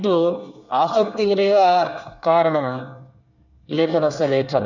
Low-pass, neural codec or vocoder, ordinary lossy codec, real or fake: 7.2 kHz; codec, 24 kHz, 1 kbps, SNAC; none; fake